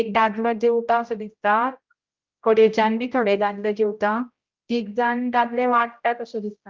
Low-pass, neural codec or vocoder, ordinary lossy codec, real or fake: 7.2 kHz; codec, 16 kHz, 0.5 kbps, X-Codec, HuBERT features, trained on general audio; Opus, 24 kbps; fake